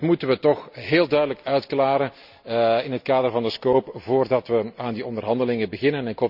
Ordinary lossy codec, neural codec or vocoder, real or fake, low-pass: none; none; real; 5.4 kHz